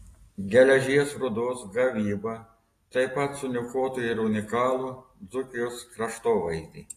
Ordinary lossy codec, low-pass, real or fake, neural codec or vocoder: AAC, 48 kbps; 14.4 kHz; real; none